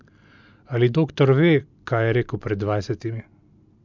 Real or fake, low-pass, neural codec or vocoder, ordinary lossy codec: real; 7.2 kHz; none; MP3, 64 kbps